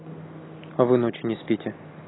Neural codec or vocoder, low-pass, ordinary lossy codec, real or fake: none; 7.2 kHz; AAC, 16 kbps; real